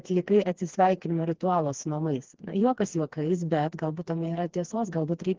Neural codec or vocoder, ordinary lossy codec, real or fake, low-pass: codec, 16 kHz, 2 kbps, FreqCodec, smaller model; Opus, 16 kbps; fake; 7.2 kHz